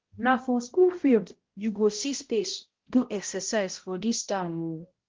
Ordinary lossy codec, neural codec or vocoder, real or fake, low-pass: Opus, 16 kbps; codec, 16 kHz, 0.5 kbps, X-Codec, HuBERT features, trained on balanced general audio; fake; 7.2 kHz